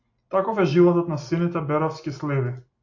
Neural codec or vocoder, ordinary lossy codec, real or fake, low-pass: none; AAC, 48 kbps; real; 7.2 kHz